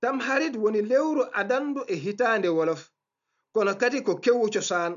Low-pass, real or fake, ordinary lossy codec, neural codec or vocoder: 7.2 kHz; real; none; none